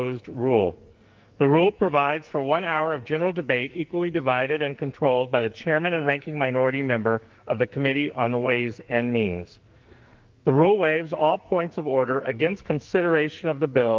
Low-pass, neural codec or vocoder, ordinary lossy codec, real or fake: 7.2 kHz; codec, 44.1 kHz, 2.6 kbps, SNAC; Opus, 24 kbps; fake